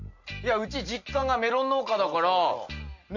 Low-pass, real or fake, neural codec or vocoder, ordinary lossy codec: 7.2 kHz; real; none; none